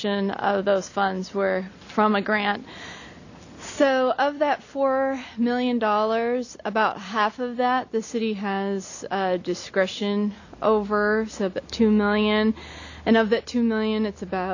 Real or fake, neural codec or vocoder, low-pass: real; none; 7.2 kHz